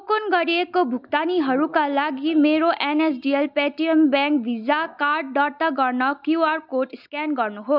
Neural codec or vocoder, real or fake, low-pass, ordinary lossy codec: none; real; 5.4 kHz; none